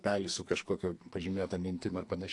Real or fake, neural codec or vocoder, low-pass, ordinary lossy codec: fake; codec, 44.1 kHz, 2.6 kbps, SNAC; 10.8 kHz; AAC, 48 kbps